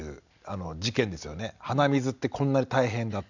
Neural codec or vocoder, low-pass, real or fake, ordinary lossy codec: none; 7.2 kHz; real; none